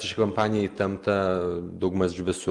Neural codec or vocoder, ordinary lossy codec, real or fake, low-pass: none; Opus, 32 kbps; real; 10.8 kHz